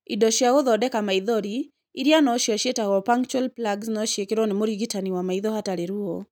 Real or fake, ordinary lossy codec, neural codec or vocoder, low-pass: real; none; none; none